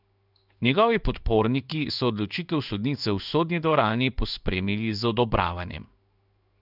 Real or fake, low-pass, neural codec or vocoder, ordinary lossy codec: fake; 5.4 kHz; codec, 16 kHz in and 24 kHz out, 1 kbps, XY-Tokenizer; none